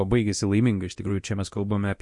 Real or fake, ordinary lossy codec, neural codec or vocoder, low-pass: fake; MP3, 48 kbps; codec, 24 kHz, 0.9 kbps, DualCodec; 10.8 kHz